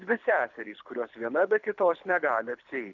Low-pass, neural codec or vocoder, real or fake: 7.2 kHz; codec, 24 kHz, 6 kbps, HILCodec; fake